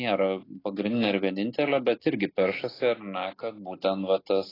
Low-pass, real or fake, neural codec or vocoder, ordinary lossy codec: 5.4 kHz; real; none; AAC, 24 kbps